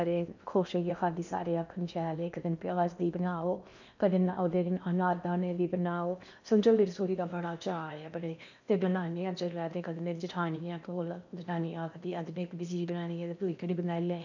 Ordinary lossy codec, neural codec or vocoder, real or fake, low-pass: none; codec, 16 kHz in and 24 kHz out, 0.8 kbps, FocalCodec, streaming, 65536 codes; fake; 7.2 kHz